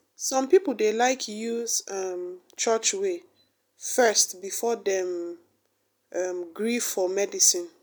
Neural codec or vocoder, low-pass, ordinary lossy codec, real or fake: none; none; none; real